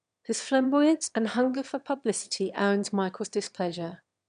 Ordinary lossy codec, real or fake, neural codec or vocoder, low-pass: none; fake; autoencoder, 22.05 kHz, a latent of 192 numbers a frame, VITS, trained on one speaker; 9.9 kHz